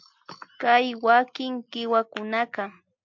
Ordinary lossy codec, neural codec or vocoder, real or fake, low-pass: MP3, 64 kbps; none; real; 7.2 kHz